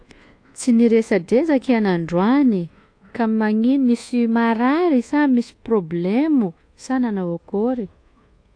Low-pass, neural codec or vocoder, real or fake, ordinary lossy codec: 9.9 kHz; codec, 24 kHz, 1.2 kbps, DualCodec; fake; AAC, 48 kbps